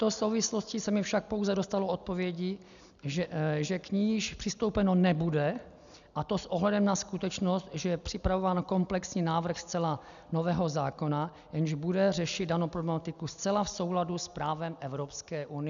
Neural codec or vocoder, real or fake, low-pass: none; real; 7.2 kHz